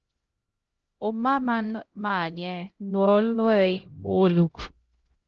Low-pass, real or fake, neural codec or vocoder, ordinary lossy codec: 7.2 kHz; fake; codec, 16 kHz, 0.5 kbps, X-Codec, HuBERT features, trained on LibriSpeech; Opus, 16 kbps